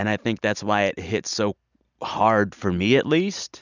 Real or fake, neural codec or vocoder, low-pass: real; none; 7.2 kHz